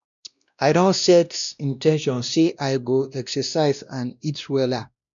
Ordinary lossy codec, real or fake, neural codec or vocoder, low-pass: none; fake; codec, 16 kHz, 1 kbps, X-Codec, WavLM features, trained on Multilingual LibriSpeech; 7.2 kHz